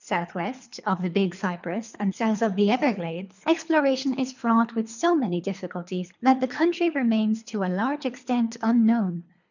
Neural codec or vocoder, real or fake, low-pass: codec, 24 kHz, 3 kbps, HILCodec; fake; 7.2 kHz